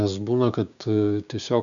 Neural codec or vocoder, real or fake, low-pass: codec, 16 kHz, 6 kbps, DAC; fake; 7.2 kHz